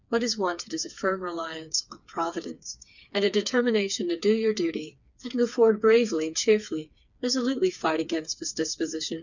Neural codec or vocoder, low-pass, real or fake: codec, 16 kHz, 4 kbps, FreqCodec, smaller model; 7.2 kHz; fake